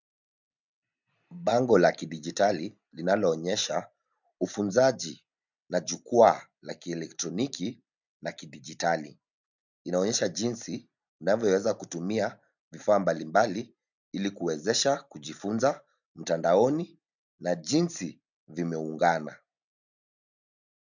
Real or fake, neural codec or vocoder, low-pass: real; none; 7.2 kHz